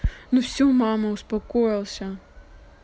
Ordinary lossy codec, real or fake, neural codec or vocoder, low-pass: none; real; none; none